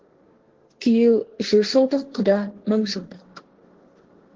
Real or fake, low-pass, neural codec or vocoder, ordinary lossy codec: fake; 7.2 kHz; codec, 16 kHz, 1.1 kbps, Voila-Tokenizer; Opus, 16 kbps